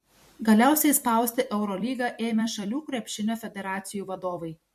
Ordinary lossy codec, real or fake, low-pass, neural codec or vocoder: MP3, 64 kbps; fake; 14.4 kHz; vocoder, 44.1 kHz, 128 mel bands every 256 samples, BigVGAN v2